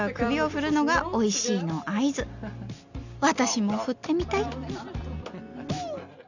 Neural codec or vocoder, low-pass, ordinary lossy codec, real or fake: none; 7.2 kHz; none; real